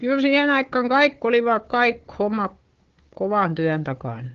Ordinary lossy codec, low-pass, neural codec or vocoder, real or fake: Opus, 16 kbps; 7.2 kHz; codec, 16 kHz, 4 kbps, FunCodec, trained on Chinese and English, 50 frames a second; fake